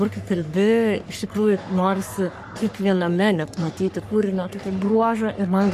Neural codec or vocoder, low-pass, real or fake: codec, 44.1 kHz, 3.4 kbps, Pupu-Codec; 14.4 kHz; fake